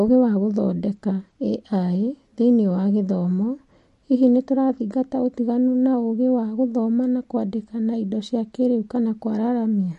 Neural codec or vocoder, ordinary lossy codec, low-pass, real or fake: none; MP3, 48 kbps; 14.4 kHz; real